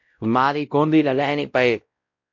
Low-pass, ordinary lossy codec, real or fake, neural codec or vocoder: 7.2 kHz; MP3, 48 kbps; fake; codec, 16 kHz, 0.5 kbps, X-Codec, WavLM features, trained on Multilingual LibriSpeech